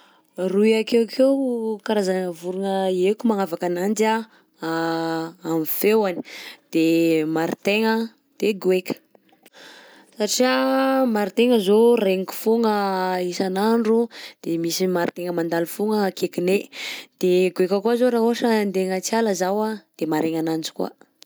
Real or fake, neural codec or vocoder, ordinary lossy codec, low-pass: real; none; none; none